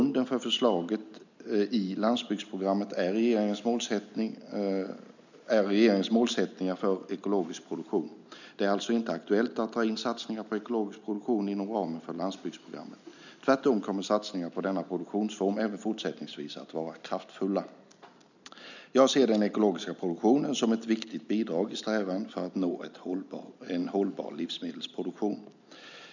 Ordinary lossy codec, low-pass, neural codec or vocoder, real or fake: none; 7.2 kHz; none; real